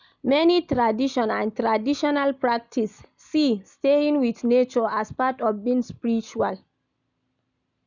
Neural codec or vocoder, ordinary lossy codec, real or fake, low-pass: none; none; real; 7.2 kHz